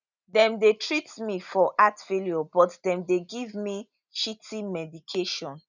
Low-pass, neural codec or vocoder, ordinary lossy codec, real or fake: 7.2 kHz; none; none; real